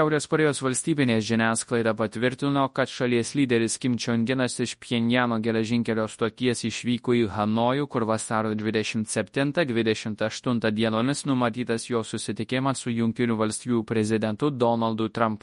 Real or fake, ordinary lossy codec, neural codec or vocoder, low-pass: fake; MP3, 48 kbps; codec, 24 kHz, 0.9 kbps, WavTokenizer, large speech release; 10.8 kHz